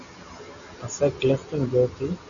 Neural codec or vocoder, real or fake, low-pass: none; real; 7.2 kHz